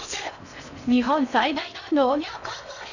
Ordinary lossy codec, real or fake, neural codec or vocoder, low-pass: none; fake; codec, 16 kHz in and 24 kHz out, 0.6 kbps, FocalCodec, streaming, 4096 codes; 7.2 kHz